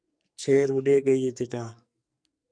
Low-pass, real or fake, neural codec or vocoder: 9.9 kHz; fake; codec, 44.1 kHz, 2.6 kbps, SNAC